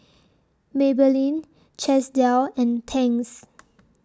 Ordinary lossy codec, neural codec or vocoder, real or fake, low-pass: none; none; real; none